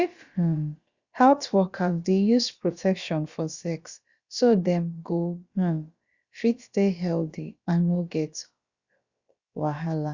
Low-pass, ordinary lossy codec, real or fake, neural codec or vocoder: 7.2 kHz; Opus, 64 kbps; fake; codec, 16 kHz, about 1 kbps, DyCAST, with the encoder's durations